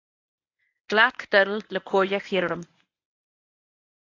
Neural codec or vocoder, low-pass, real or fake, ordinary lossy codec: codec, 24 kHz, 0.9 kbps, WavTokenizer, medium speech release version 1; 7.2 kHz; fake; AAC, 48 kbps